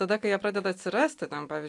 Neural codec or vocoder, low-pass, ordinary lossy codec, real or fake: none; 10.8 kHz; AAC, 64 kbps; real